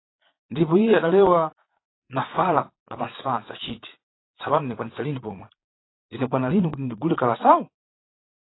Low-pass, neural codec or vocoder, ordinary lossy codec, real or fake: 7.2 kHz; vocoder, 22.05 kHz, 80 mel bands, Vocos; AAC, 16 kbps; fake